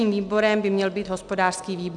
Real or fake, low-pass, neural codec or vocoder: real; 10.8 kHz; none